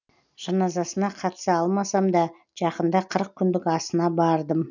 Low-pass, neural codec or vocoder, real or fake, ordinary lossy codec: 7.2 kHz; none; real; none